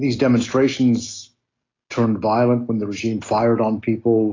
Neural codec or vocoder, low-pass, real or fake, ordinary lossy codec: none; 7.2 kHz; real; AAC, 32 kbps